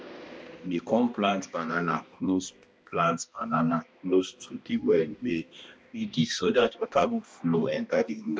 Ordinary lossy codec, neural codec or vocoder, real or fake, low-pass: none; codec, 16 kHz, 1 kbps, X-Codec, HuBERT features, trained on balanced general audio; fake; none